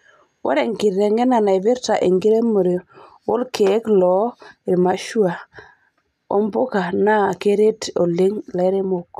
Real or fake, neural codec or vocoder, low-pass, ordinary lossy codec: real; none; 14.4 kHz; none